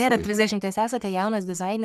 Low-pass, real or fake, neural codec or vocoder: 14.4 kHz; fake; codec, 44.1 kHz, 3.4 kbps, Pupu-Codec